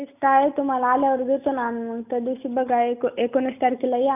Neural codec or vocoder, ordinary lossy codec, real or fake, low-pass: none; none; real; 3.6 kHz